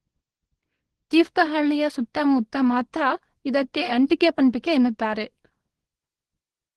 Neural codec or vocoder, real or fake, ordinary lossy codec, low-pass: codec, 24 kHz, 0.9 kbps, WavTokenizer, small release; fake; Opus, 16 kbps; 10.8 kHz